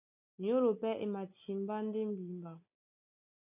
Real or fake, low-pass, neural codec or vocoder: real; 3.6 kHz; none